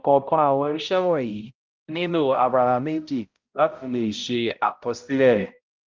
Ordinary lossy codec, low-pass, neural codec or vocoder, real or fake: Opus, 32 kbps; 7.2 kHz; codec, 16 kHz, 0.5 kbps, X-Codec, HuBERT features, trained on balanced general audio; fake